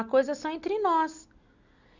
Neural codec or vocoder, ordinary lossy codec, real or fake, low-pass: none; none; real; 7.2 kHz